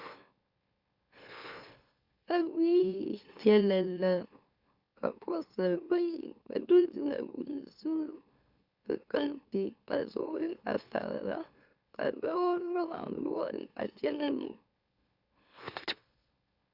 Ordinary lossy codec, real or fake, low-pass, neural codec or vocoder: Opus, 64 kbps; fake; 5.4 kHz; autoencoder, 44.1 kHz, a latent of 192 numbers a frame, MeloTTS